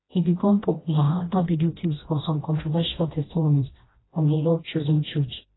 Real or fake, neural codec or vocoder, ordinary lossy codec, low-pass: fake; codec, 16 kHz, 1 kbps, FreqCodec, smaller model; AAC, 16 kbps; 7.2 kHz